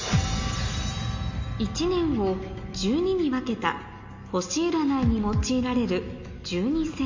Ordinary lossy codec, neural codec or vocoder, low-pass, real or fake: MP3, 48 kbps; none; 7.2 kHz; real